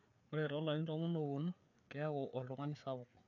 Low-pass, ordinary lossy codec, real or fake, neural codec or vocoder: 7.2 kHz; none; fake; codec, 16 kHz, 4 kbps, FreqCodec, larger model